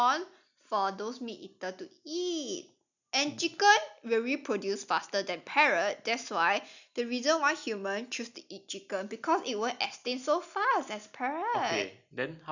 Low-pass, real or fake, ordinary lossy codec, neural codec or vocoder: 7.2 kHz; real; none; none